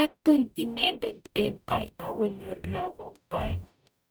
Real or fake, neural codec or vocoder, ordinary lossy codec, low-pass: fake; codec, 44.1 kHz, 0.9 kbps, DAC; none; none